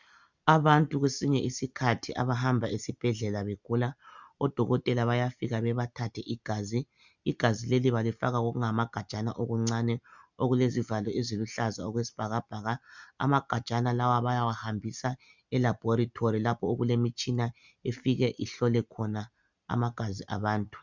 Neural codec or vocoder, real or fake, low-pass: none; real; 7.2 kHz